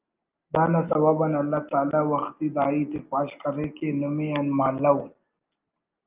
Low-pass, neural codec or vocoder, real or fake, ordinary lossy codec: 3.6 kHz; none; real; Opus, 24 kbps